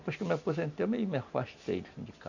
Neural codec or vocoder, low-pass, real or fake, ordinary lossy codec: none; 7.2 kHz; real; none